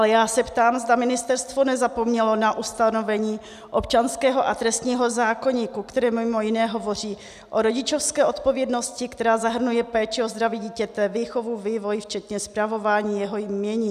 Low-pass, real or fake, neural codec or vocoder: 14.4 kHz; real; none